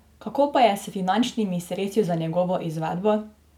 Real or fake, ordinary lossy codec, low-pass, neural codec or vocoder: real; none; 19.8 kHz; none